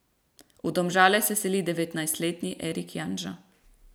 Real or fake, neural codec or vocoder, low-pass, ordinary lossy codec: real; none; none; none